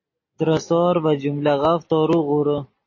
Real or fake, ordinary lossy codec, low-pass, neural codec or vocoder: real; MP3, 32 kbps; 7.2 kHz; none